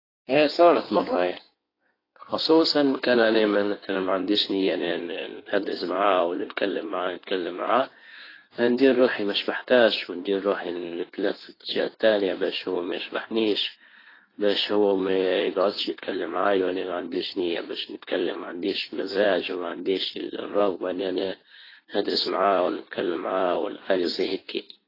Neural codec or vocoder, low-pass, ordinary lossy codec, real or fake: codec, 16 kHz in and 24 kHz out, 1.1 kbps, FireRedTTS-2 codec; 5.4 kHz; AAC, 24 kbps; fake